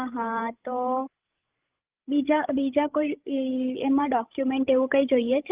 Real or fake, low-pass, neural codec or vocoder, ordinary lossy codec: fake; 3.6 kHz; codec, 16 kHz, 16 kbps, FreqCodec, larger model; Opus, 24 kbps